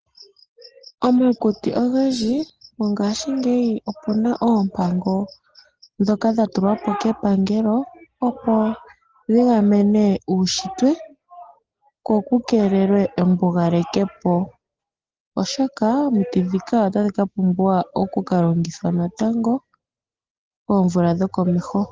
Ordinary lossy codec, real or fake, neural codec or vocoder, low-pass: Opus, 16 kbps; real; none; 7.2 kHz